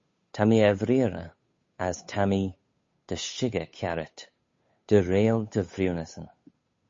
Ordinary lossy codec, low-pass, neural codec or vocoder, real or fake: MP3, 32 kbps; 7.2 kHz; codec, 16 kHz, 8 kbps, FunCodec, trained on Chinese and English, 25 frames a second; fake